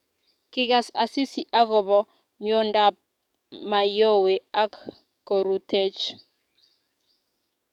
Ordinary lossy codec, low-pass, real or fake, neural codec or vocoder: none; 19.8 kHz; fake; codec, 44.1 kHz, 7.8 kbps, DAC